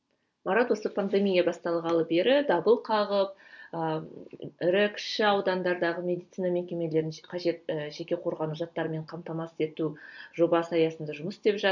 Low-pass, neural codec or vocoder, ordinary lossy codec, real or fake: 7.2 kHz; none; none; real